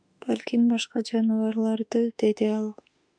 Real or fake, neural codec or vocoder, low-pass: fake; autoencoder, 48 kHz, 32 numbers a frame, DAC-VAE, trained on Japanese speech; 9.9 kHz